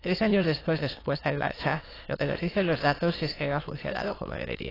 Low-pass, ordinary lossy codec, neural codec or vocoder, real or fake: 5.4 kHz; AAC, 24 kbps; autoencoder, 22.05 kHz, a latent of 192 numbers a frame, VITS, trained on many speakers; fake